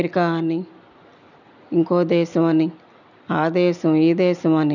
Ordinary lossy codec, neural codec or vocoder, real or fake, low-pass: none; none; real; 7.2 kHz